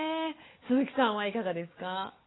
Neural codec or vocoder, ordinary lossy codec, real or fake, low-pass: codec, 16 kHz, 4 kbps, FunCodec, trained on Chinese and English, 50 frames a second; AAC, 16 kbps; fake; 7.2 kHz